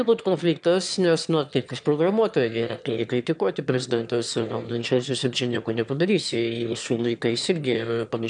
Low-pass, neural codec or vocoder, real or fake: 9.9 kHz; autoencoder, 22.05 kHz, a latent of 192 numbers a frame, VITS, trained on one speaker; fake